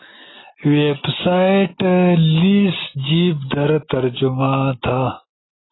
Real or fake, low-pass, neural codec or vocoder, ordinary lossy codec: real; 7.2 kHz; none; AAC, 16 kbps